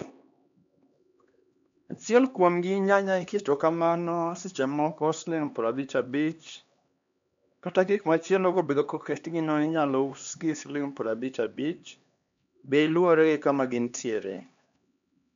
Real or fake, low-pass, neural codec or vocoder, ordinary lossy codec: fake; 7.2 kHz; codec, 16 kHz, 2 kbps, X-Codec, HuBERT features, trained on LibriSpeech; MP3, 64 kbps